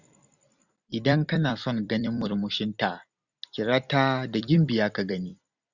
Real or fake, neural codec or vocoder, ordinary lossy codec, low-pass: fake; vocoder, 44.1 kHz, 128 mel bands every 256 samples, BigVGAN v2; none; 7.2 kHz